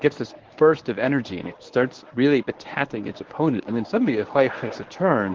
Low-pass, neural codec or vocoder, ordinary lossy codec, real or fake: 7.2 kHz; codec, 24 kHz, 0.9 kbps, WavTokenizer, medium speech release version 1; Opus, 16 kbps; fake